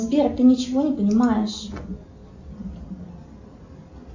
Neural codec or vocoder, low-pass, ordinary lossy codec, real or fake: none; 7.2 kHz; AAC, 48 kbps; real